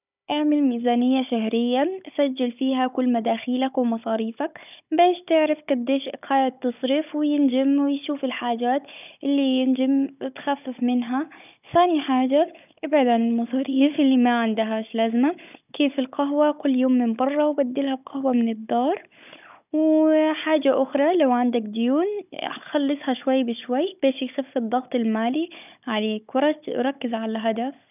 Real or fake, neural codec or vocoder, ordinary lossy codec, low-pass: fake; codec, 16 kHz, 16 kbps, FunCodec, trained on Chinese and English, 50 frames a second; none; 3.6 kHz